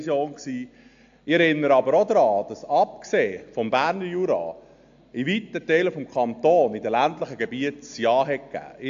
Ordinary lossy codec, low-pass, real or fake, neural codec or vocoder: AAC, 64 kbps; 7.2 kHz; real; none